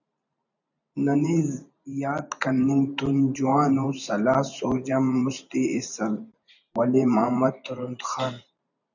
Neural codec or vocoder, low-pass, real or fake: vocoder, 44.1 kHz, 128 mel bands every 512 samples, BigVGAN v2; 7.2 kHz; fake